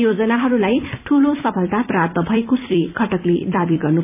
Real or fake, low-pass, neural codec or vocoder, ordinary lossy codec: fake; 3.6 kHz; vocoder, 44.1 kHz, 128 mel bands every 256 samples, BigVGAN v2; none